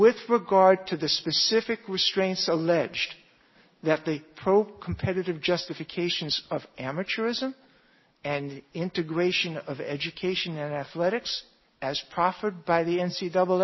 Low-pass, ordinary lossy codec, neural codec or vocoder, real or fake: 7.2 kHz; MP3, 24 kbps; none; real